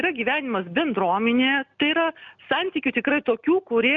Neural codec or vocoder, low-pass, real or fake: none; 7.2 kHz; real